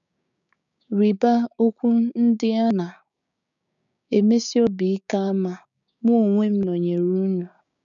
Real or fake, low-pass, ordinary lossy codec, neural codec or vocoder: fake; 7.2 kHz; none; codec, 16 kHz, 6 kbps, DAC